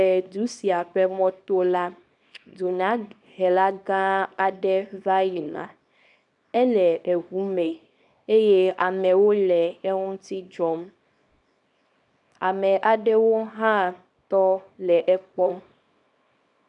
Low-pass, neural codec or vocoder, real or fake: 10.8 kHz; codec, 24 kHz, 0.9 kbps, WavTokenizer, small release; fake